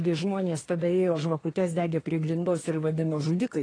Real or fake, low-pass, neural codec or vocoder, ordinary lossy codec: fake; 9.9 kHz; codec, 24 kHz, 1 kbps, SNAC; AAC, 32 kbps